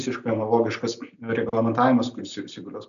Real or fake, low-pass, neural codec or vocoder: real; 7.2 kHz; none